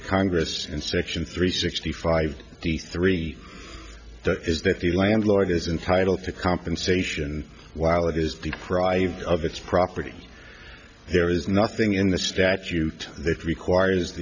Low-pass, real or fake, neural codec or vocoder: 7.2 kHz; real; none